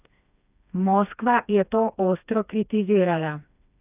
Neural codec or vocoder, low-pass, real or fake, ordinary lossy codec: codec, 16 kHz, 2 kbps, FreqCodec, smaller model; 3.6 kHz; fake; none